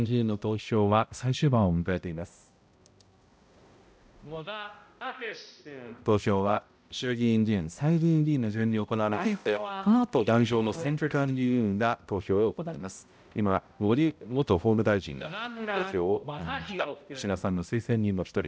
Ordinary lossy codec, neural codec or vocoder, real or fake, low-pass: none; codec, 16 kHz, 0.5 kbps, X-Codec, HuBERT features, trained on balanced general audio; fake; none